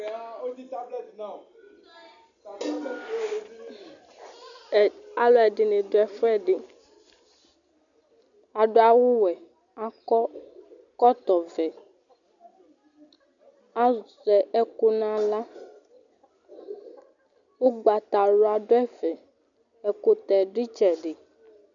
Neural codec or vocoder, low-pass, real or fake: none; 7.2 kHz; real